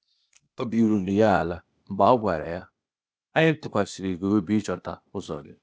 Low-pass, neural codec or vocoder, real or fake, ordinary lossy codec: none; codec, 16 kHz, 0.8 kbps, ZipCodec; fake; none